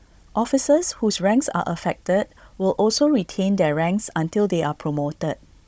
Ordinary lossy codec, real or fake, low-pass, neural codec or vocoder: none; fake; none; codec, 16 kHz, 16 kbps, FunCodec, trained on Chinese and English, 50 frames a second